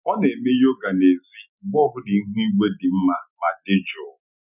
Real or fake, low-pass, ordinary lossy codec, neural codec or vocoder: real; 3.6 kHz; none; none